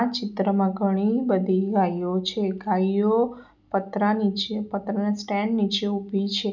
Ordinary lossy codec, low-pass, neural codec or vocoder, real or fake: none; 7.2 kHz; none; real